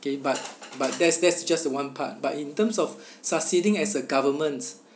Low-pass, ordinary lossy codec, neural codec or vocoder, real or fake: none; none; none; real